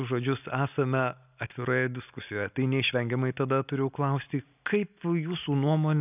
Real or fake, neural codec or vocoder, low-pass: real; none; 3.6 kHz